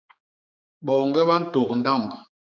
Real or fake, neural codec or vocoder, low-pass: fake; codec, 16 kHz, 4 kbps, X-Codec, HuBERT features, trained on general audio; 7.2 kHz